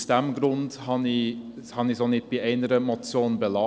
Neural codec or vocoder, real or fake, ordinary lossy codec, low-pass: none; real; none; none